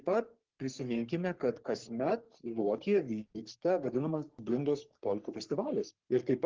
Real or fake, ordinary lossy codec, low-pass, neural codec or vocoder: fake; Opus, 16 kbps; 7.2 kHz; codec, 44.1 kHz, 3.4 kbps, Pupu-Codec